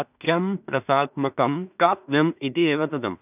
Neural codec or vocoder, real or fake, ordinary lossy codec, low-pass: codec, 16 kHz in and 24 kHz out, 0.4 kbps, LongCat-Audio-Codec, two codebook decoder; fake; none; 3.6 kHz